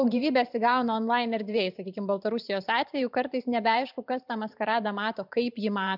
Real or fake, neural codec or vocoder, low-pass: fake; vocoder, 44.1 kHz, 128 mel bands every 512 samples, BigVGAN v2; 5.4 kHz